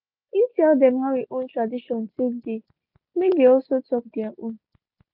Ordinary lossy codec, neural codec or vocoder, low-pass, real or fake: none; none; 5.4 kHz; real